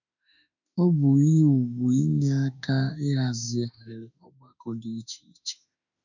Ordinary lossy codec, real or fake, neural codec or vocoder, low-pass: none; fake; autoencoder, 48 kHz, 32 numbers a frame, DAC-VAE, trained on Japanese speech; 7.2 kHz